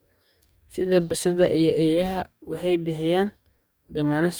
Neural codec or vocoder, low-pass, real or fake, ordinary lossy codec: codec, 44.1 kHz, 2.6 kbps, DAC; none; fake; none